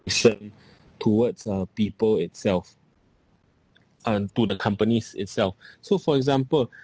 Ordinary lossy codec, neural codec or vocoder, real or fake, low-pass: none; none; real; none